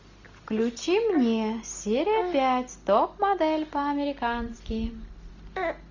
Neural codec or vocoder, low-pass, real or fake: none; 7.2 kHz; real